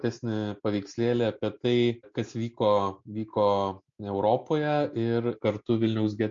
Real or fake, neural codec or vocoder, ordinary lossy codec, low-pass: real; none; MP3, 48 kbps; 7.2 kHz